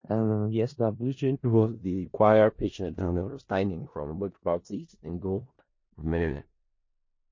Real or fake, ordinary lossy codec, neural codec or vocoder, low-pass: fake; MP3, 32 kbps; codec, 16 kHz in and 24 kHz out, 0.4 kbps, LongCat-Audio-Codec, four codebook decoder; 7.2 kHz